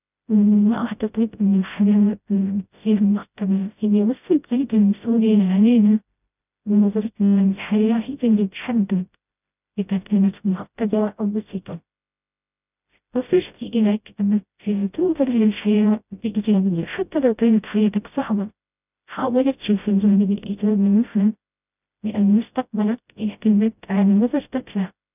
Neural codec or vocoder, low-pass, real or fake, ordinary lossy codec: codec, 16 kHz, 0.5 kbps, FreqCodec, smaller model; 3.6 kHz; fake; none